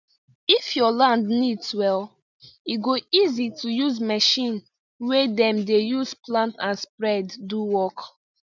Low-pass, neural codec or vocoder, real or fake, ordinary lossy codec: 7.2 kHz; none; real; none